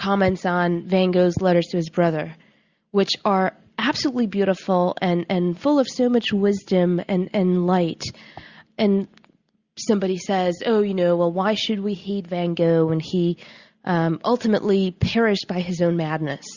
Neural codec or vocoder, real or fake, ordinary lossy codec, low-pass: none; real; Opus, 64 kbps; 7.2 kHz